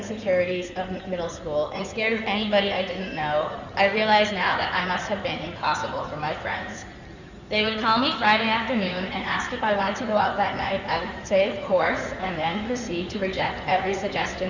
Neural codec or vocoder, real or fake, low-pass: codec, 16 kHz in and 24 kHz out, 2.2 kbps, FireRedTTS-2 codec; fake; 7.2 kHz